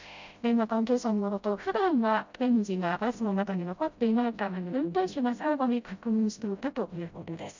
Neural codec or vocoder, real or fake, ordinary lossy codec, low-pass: codec, 16 kHz, 0.5 kbps, FreqCodec, smaller model; fake; MP3, 64 kbps; 7.2 kHz